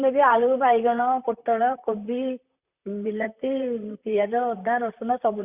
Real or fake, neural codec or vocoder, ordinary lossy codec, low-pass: fake; vocoder, 44.1 kHz, 128 mel bands, Pupu-Vocoder; Opus, 64 kbps; 3.6 kHz